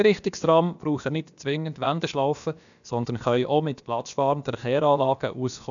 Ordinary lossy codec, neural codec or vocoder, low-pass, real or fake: none; codec, 16 kHz, about 1 kbps, DyCAST, with the encoder's durations; 7.2 kHz; fake